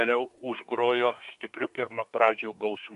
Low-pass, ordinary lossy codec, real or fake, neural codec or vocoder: 10.8 kHz; AAC, 96 kbps; fake; codec, 24 kHz, 1 kbps, SNAC